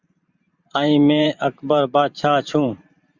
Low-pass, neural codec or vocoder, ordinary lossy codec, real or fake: 7.2 kHz; vocoder, 44.1 kHz, 128 mel bands every 512 samples, BigVGAN v2; Opus, 64 kbps; fake